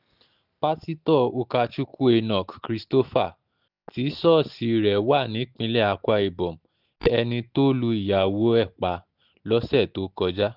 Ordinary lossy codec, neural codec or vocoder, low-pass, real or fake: none; none; 5.4 kHz; real